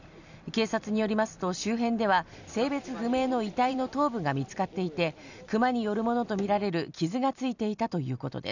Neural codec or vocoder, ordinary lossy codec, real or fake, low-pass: vocoder, 44.1 kHz, 128 mel bands every 256 samples, BigVGAN v2; none; fake; 7.2 kHz